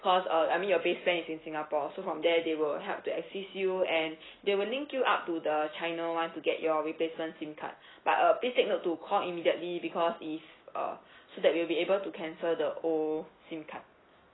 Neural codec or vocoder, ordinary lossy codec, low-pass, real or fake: none; AAC, 16 kbps; 7.2 kHz; real